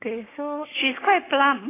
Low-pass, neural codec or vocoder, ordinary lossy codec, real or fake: 3.6 kHz; codec, 16 kHz, 6 kbps, DAC; AAC, 16 kbps; fake